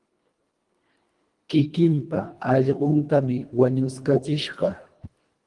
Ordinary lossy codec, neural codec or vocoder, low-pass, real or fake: Opus, 24 kbps; codec, 24 kHz, 1.5 kbps, HILCodec; 10.8 kHz; fake